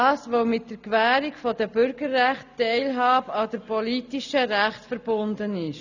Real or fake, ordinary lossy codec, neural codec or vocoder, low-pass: real; none; none; 7.2 kHz